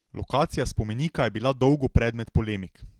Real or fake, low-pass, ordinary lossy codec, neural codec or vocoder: real; 19.8 kHz; Opus, 16 kbps; none